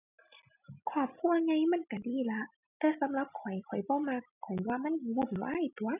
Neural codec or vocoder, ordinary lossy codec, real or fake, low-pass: none; none; real; 3.6 kHz